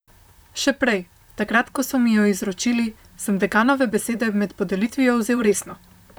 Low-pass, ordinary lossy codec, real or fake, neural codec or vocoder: none; none; fake; vocoder, 44.1 kHz, 128 mel bands, Pupu-Vocoder